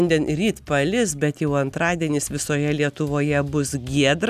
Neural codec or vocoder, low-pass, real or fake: none; 14.4 kHz; real